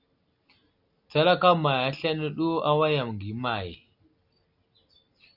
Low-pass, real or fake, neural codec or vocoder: 5.4 kHz; real; none